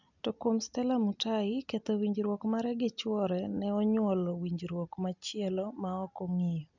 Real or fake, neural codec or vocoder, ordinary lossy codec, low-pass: real; none; none; 7.2 kHz